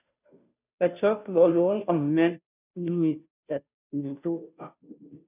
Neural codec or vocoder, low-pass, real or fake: codec, 16 kHz, 0.5 kbps, FunCodec, trained on Chinese and English, 25 frames a second; 3.6 kHz; fake